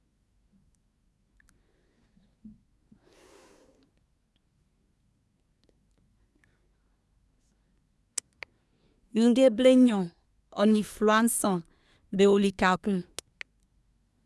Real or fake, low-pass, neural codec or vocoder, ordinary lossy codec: fake; none; codec, 24 kHz, 1 kbps, SNAC; none